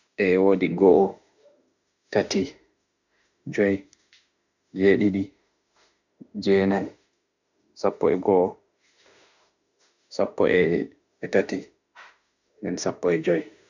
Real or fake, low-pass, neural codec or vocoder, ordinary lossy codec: fake; 7.2 kHz; autoencoder, 48 kHz, 32 numbers a frame, DAC-VAE, trained on Japanese speech; none